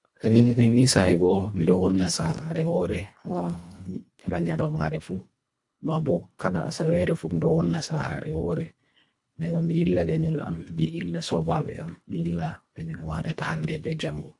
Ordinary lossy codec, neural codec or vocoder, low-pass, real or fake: AAC, 64 kbps; codec, 24 kHz, 1.5 kbps, HILCodec; 10.8 kHz; fake